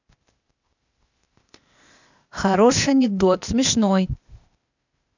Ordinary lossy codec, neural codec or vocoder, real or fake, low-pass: none; codec, 16 kHz, 0.8 kbps, ZipCodec; fake; 7.2 kHz